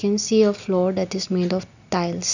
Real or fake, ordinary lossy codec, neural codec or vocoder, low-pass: real; none; none; 7.2 kHz